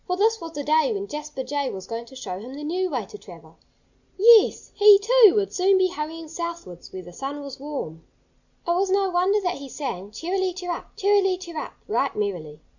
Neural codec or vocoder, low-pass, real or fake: none; 7.2 kHz; real